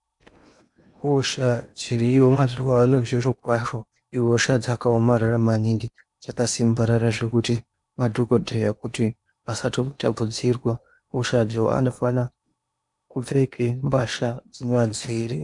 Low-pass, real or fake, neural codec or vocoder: 10.8 kHz; fake; codec, 16 kHz in and 24 kHz out, 0.8 kbps, FocalCodec, streaming, 65536 codes